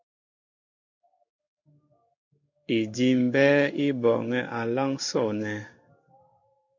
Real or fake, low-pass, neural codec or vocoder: fake; 7.2 kHz; codec, 16 kHz in and 24 kHz out, 1 kbps, XY-Tokenizer